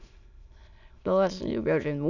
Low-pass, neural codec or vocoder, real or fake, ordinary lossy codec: 7.2 kHz; autoencoder, 22.05 kHz, a latent of 192 numbers a frame, VITS, trained on many speakers; fake; none